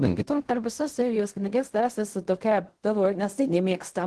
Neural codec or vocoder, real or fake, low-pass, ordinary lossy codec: codec, 16 kHz in and 24 kHz out, 0.4 kbps, LongCat-Audio-Codec, fine tuned four codebook decoder; fake; 10.8 kHz; Opus, 16 kbps